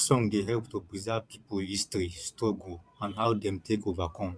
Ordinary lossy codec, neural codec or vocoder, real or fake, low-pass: none; vocoder, 22.05 kHz, 80 mel bands, Vocos; fake; none